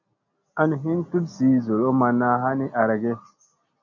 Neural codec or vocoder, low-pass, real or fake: none; 7.2 kHz; real